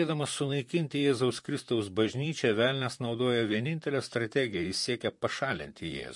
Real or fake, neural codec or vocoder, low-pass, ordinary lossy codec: fake; vocoder, 44.1 kHz, 128 mel bands, Pupu-Vocoder; 10.8 kHz; MP3, 48 kbps